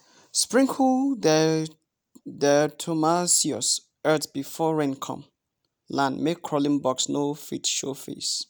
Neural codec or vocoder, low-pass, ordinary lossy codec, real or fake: none; none; none; real